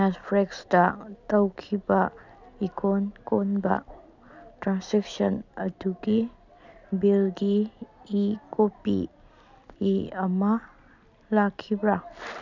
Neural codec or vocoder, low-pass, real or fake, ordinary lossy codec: none; 7.2 kHz; real; none